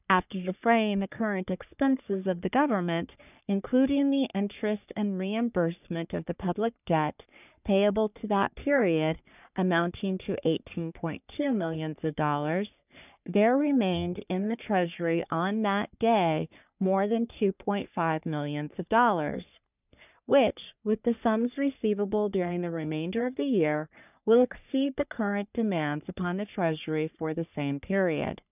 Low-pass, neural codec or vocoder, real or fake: 3.6 kHz; codec, 44.1 kHz, 3.4 kbps, Pupu-Codec; fake